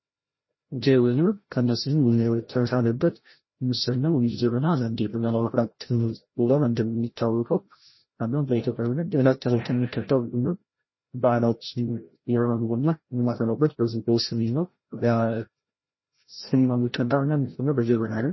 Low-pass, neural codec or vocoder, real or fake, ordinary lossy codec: 7.2 kHz; codec, 16 kHz, 0.5 kbps, FreqCodec, larger model; fake; MP3, 24 kbps